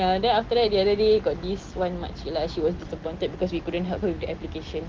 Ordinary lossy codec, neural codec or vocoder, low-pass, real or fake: Opus, 16 kbps; none; 7.2 kHz; real